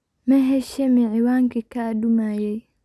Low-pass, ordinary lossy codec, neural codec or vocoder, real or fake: none; none; none; real